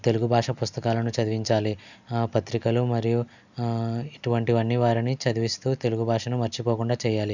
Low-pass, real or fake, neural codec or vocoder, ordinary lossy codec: 7.2 kHz; real; none; none